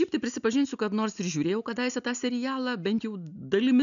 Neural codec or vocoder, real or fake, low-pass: none; real; 7.2 kHz